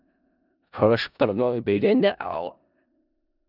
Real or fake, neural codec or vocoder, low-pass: fake; codec, 16 kHz in and 24 kHz out, 0.4 kbps, LongCat-Audio-Codec, four codebook decoder; 5.4 kHz